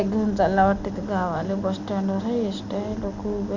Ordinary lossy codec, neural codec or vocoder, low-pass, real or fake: none; none; 7.2 kHz; real